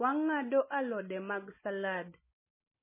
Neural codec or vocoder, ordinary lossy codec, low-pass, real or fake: none; MP3, 16 kbps; 3.6 kHz; real